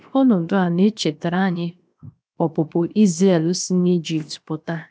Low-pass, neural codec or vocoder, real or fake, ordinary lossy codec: none; codec, 16 kHz, 0.7 kbps, FocalCodec; fake; none